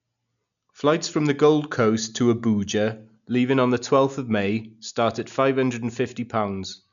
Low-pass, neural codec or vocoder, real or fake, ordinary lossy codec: 7.2 kHz; none; real; none